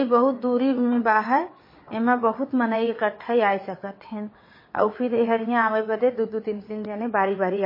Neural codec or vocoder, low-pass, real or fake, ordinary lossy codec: vocoder, 22.05 kHz, 80 mel bands, WaveNeXt; 5.4 kHz; fake; MP3, 24 kbps